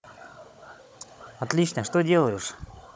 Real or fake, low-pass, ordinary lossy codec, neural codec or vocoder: fake; none; none; codec, 16 kHz, 16 kbps, FunCodec, trained on Chinese and English, 50 frames a second